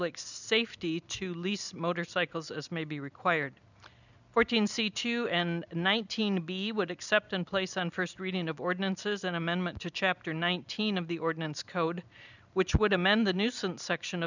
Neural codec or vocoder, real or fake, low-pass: none; real; 7.2 kHz